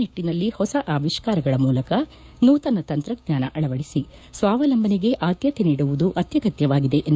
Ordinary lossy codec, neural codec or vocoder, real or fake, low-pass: none; codec, 16 kHz, 6 kbps, DAC; fake; none